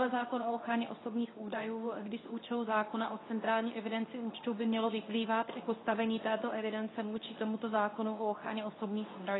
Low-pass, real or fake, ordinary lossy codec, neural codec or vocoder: 7.2 kHz; fake; AAC, 16 kbps; codec, 24 kHz, 0.9 kbps, WavTokenizer, medium speech release version 2